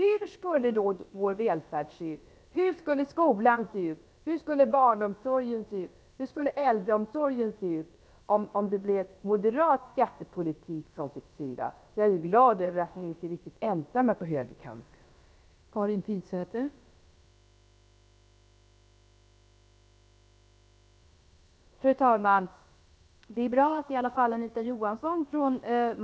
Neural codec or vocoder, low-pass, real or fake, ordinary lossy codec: codec, 16 kHz, about 1 kbps, DyCAST, with the encoder's durations; none; fake; none